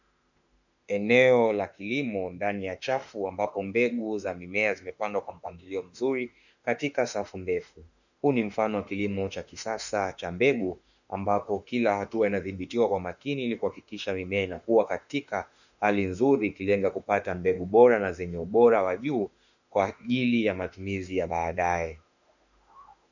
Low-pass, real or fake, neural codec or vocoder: 7.2 kHz; fake; autoencoder, 48 kHz, 32 numbers a frame, DAC-VAE, trained on Japanese speech